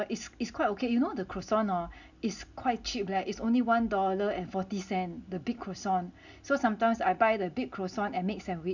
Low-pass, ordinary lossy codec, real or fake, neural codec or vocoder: 7.2 kHz; none; real; none